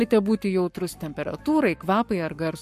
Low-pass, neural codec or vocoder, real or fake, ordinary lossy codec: 14.4 kHz; codec, 44.1 kHz, 7.8 kbps, Pupu-Codec; fake; MP3, 64 kbps